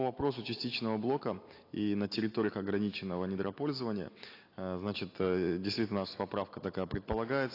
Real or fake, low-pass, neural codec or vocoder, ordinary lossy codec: real; 5.4 kHz; none; AAC, 32 kbps